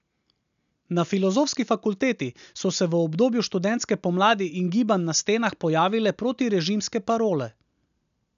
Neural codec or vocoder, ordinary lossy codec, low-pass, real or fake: none; none; 7.2 kHz; real